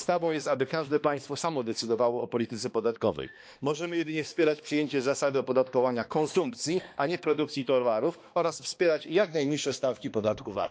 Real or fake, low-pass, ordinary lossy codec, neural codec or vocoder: fake; none; none; codec, 16 kHz, 2 kbps, X-Codec, HuBERT features, trained on balanced general audio